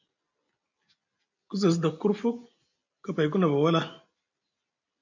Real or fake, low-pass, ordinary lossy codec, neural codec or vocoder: real; 7.2 kHz; AAC, 48 kbps; none